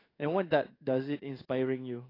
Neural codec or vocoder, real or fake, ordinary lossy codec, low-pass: codec, 16 kHz in and 24 kHz out, 1 kbps, XY-Tokenizer; fake; AAC, 24 kbps; 5.4 kHz